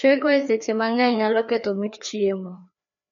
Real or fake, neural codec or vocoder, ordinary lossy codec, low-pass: fake; codec, 16 kHz, 2 kbps, FreqCodec, larger model; MP3, 48 kbps; 7.2 kHz